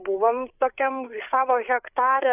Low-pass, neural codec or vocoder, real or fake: 3.6 kHz; codec, 16 kHz, 8 kbps, FreqCodec, larger model; fake